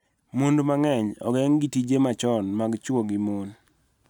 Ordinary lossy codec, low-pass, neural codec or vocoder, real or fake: none; 19.8 kHz; none; real